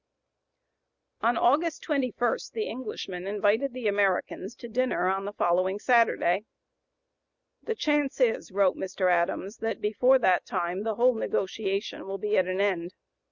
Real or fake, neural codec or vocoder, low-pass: real; none; 7.2 kHz